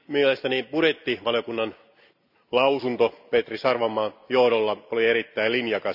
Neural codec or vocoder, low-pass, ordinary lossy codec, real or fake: none; 5.4 kHz; none; real